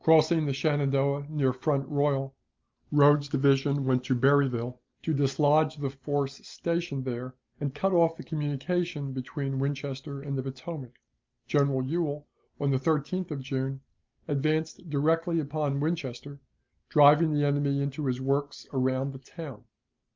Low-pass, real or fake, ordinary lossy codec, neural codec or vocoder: 7.2 kHz; real; Opus, 24 kbps; none